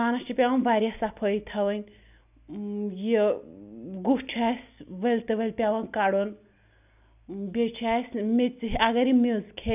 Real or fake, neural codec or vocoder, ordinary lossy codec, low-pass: real; none; none; 3.6 kHz